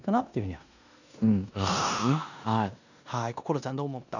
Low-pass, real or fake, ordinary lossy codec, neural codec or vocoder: 7.2 kHz; fake; none; codec, 16 kHz in and 24 kHz out, 0.9 kbps, LongCat-Audio-Codec, four codebook decoder